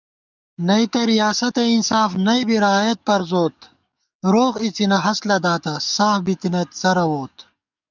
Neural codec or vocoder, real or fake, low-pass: codec, 44.1 kHz, 7.8 kbps, DAC; fake; 7.2 kHz